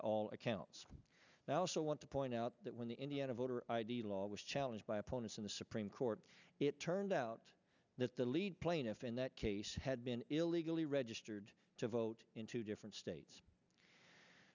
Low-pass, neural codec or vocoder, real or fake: 7.2 kHz; none; real